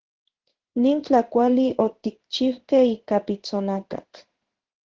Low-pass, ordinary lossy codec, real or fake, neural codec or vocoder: 7.2 kHz; Opus, 16 kbps; fake; codec, 16 kHz in and 24 kHz out, 1 kbps, XY-Tokenizer